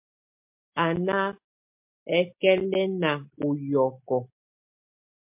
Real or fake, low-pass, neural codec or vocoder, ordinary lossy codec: real; 3.6 kHz; none; MP3, 32 kbps